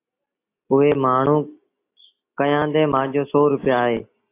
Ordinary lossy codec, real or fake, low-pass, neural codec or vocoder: AAC, 24 kbps; real; 3.6 kHz; none